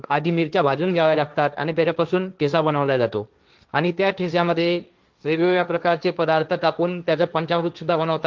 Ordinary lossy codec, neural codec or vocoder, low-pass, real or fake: Opus, 24 kbps; codec, 16 kHz, 1.1 kbps, Voila-Tokenizer; 7.2 kHz; fake